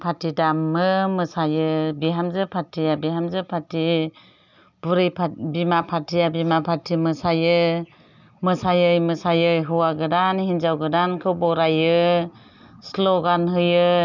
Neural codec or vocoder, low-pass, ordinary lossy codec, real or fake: none; 7.2 kHz; none; real